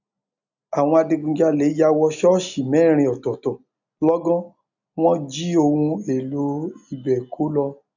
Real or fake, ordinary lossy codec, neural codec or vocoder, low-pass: real; none; none; 7.2 kHz